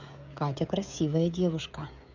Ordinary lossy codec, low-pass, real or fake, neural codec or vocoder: none; 7.2 kHz; fake; codec, 16 kHz, 16 kbps, FreqCodec, smaller model